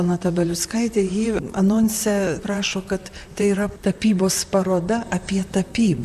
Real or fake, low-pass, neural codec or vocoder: fake; 14.4 kHz; vocoder, 44.1 kHz, 128 mel bands, Pupu-Vocoder